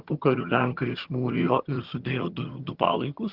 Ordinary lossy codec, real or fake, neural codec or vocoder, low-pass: Opus, 16 kbps; fake; vocoder, 22.05 kHz, 80 mel bands, HiFi-GAN; 5.4 kHz